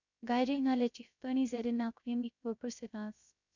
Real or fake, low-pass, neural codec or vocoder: fake; 7.2 kHz; codec, 16 kHz, 0.3 kbps, FocalCodec